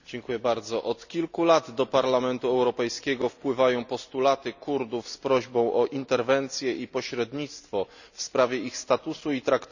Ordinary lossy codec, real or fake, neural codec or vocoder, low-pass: none; real; none; 7.2 kHz